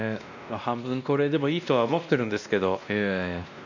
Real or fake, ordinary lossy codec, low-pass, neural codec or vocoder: fake; none; 7.2 kHz; codec, 16 kHz, 1 kbps, X-Codec, WavLM features, trained on Multilingual LibriSpeech